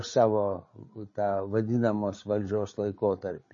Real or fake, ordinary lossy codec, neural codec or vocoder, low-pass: fake; MP3, 32 kbps; codec, 16 kHz, 8 kbps, FreqCodec, larger model; 7.2 kHz